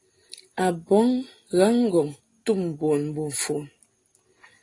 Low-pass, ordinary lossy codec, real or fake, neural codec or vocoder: 10.8 kHz; AAC, 32 kbps; real; none